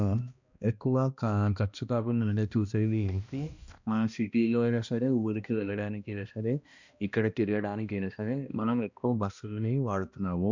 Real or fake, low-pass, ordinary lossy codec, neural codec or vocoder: fake; 7.2 kHz; none; codec, 16 kHz, 1 kbps, X-Codec, HuBERT features, trained on balanced general audio